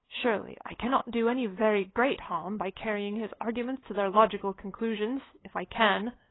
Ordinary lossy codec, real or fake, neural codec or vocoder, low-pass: AAC, 16 kbps; fake; codec, 16 kHz, 8 kbps, FunCodec, trained on LibriTTS, 25 frames a second; 7.2 kHz